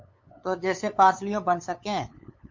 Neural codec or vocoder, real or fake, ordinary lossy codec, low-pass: codec, 16 kHz, 8 kbps, FunCodec, trained on LibriTTS, 25 frames a second; fake; MP3, 48 kbps; 7.2 kHz